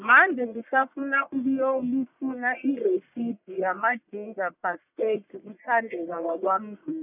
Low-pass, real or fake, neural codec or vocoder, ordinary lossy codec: 3.6 kHz; fake; codec, 44.1 kHz, 1.7 kbps, Pupu-Codec; none